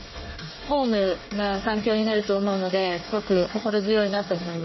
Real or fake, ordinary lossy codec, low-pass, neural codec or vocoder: fake; MP3, 24 kbps; 7.2 kHz; codec, 24 kHz, 1 kbps, SNAC